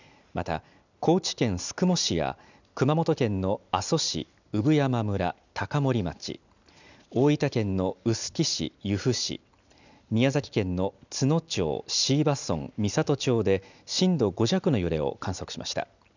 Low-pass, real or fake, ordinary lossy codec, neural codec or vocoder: 7.2 kHz; real; none; none